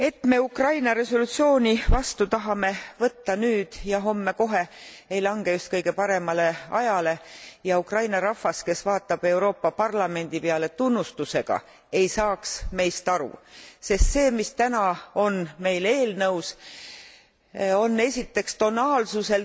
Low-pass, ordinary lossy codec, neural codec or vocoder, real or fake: none; none; none; real